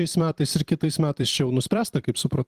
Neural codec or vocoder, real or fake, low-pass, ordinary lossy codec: vocoder, 44.1 kHz, 128 mel bands every 256 samples, BigVGAN v2; fake; 14.4 kHz; Opus, 24 kbps